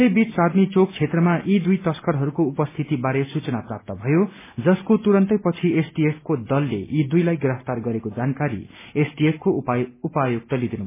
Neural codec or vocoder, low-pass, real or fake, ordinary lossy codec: none; 3.6 kHz; real; MP3, 16 kbps